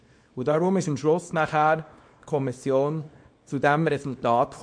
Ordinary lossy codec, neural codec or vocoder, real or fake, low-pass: MP3, 48 kbps; codec, 24 kHz, 0.9 kbps, WavTokenizer, small release; fake; 9.9 kHz